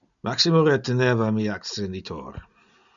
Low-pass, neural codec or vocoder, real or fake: 7.2 kHz; none; real